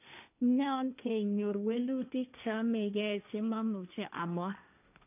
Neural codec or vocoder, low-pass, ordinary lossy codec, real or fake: codec, 16 kHz, 1.1 kbps, Voila-Tokenizer; 3.6 kHz; none; fake